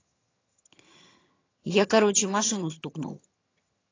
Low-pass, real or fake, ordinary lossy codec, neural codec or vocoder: 7.2 kHz; fake; AAC, 32 kbps; vocoder, 22.05 kHz, 80 mel bands, HiFi-GAN